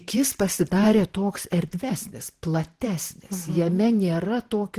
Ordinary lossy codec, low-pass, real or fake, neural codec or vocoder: Opus, 16 kbps; 14.4 kHz; fake; vocoder, 44.1 kHz, 128 mel bands every 512 samples, BigVGAN v2